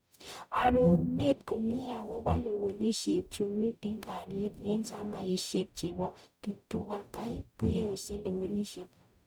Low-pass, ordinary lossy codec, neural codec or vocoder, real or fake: none; none; codec, 44.1 kHz, 0.9 kbps, DAC; fake